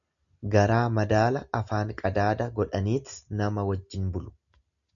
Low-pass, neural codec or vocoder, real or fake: 7.2 kHz; none; real